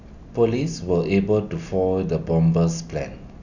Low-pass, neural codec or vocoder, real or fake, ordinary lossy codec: 7.2 kHz; none; real; none